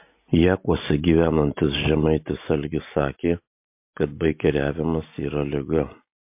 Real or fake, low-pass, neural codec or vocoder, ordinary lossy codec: real; 3.6 kHz; none; MP3, 32 kbps